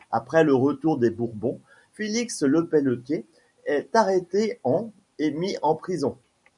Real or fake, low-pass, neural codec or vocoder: real; 10.8 kHz; none